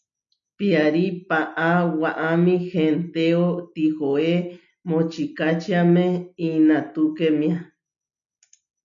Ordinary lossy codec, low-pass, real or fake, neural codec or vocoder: MP3, 96 kbps; 7.2 kHz; real; none